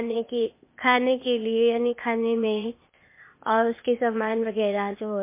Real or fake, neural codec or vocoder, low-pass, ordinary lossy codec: fake; codec, 16 kHz, 0.8 kbps, ZipCodec; 3.6 kHz; MP3, 24 kbps